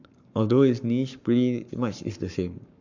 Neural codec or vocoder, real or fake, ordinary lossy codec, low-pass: codec, 44.1 kHz, 7.8 kbps, Pupu-Codec; fake; none; 7.2 kHz